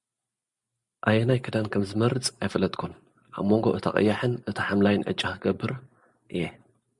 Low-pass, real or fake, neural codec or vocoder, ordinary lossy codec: 10.8 kHz; real; none; Opus, 64 kbps